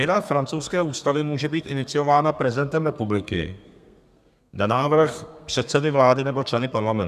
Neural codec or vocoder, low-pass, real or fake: codec, 32 kHz, 1.9 kbps, SNAC; 14.4 kHz; fake